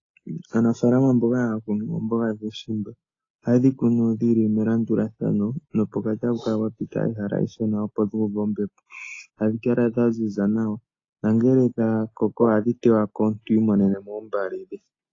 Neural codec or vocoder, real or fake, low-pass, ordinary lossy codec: none; real; 7.2 kHz; AAC, 32 kbps